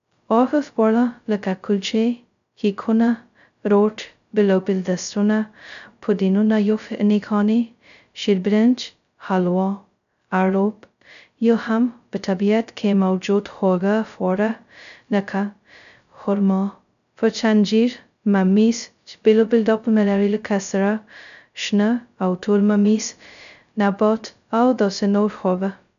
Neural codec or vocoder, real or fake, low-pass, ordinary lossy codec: codec, 16 kHz, 0.2 kbps, FocalCodec; fake; 7.2 kHz; none